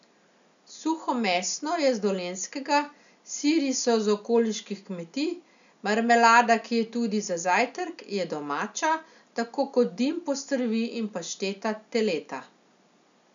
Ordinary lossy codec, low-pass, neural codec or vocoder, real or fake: none; 7.2 kHz; none; real